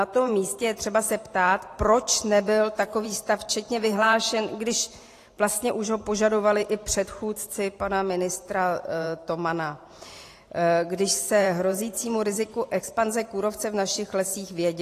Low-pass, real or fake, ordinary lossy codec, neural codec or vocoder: 14.4 kHz; fake; AAC, 48 kbps; vocoder, 44.1 kHz, 128 mel bands every 512 samples, BigVGAN v2